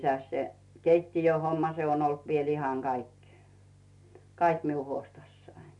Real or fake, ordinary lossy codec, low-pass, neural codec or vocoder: real; none; 10.8 kHz; none